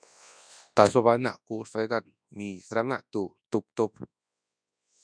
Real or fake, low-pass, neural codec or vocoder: fake; 9.9 kHz; codec, 24 kHz, 0.9 kbps, WavTokenizer, large speech release